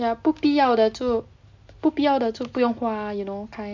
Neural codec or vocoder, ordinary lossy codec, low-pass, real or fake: none; MP3, 64 kbps; 7.2 kHz; real